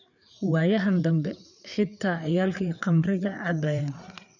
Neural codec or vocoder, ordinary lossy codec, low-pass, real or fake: codec, 16 kHz, 4 kbps, FreqCodec, larger model; none; 7.2 kHz; fake